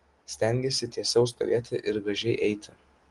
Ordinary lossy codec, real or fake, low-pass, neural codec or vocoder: Opus, 24 kbps; real; 10.8 kHz; none